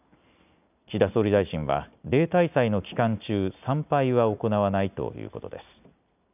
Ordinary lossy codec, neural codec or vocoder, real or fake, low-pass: none; none; real; 3.6 kHz